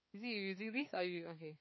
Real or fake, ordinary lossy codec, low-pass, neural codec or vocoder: fake; MP3, 24 kbps; 7.2 kHz; codec, 16 kHz, 4 kbps, X-Codec, HuBERT features, trained on balanced general audio